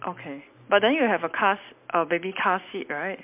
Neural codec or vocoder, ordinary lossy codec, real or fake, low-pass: none; MP3, 32 kbps; real; 3.6 kHz